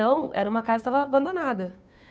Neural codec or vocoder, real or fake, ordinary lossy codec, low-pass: codec, 16 kHz, 2 kbps, FunCodec, trained on Chinese and English, 25 frames a second; fake; none; none